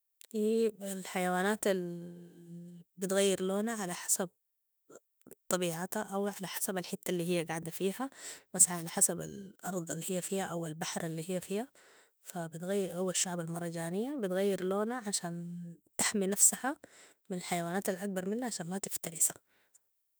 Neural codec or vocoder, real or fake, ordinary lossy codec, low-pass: autoencoder, 48 kHz, 32 numbers a frame, DAC-VAE, trained on Japanese speech; fake; none; none